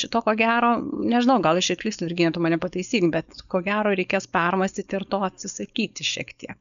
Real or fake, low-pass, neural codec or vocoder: fake; 7.2 kHz; codec, 16 kHz, 4.8 kbps, FACodec